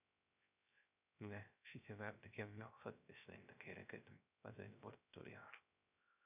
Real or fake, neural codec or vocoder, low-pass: fake; codec, 16 kHz, 0.3 kbps, FocalCodec; 3.6 kHz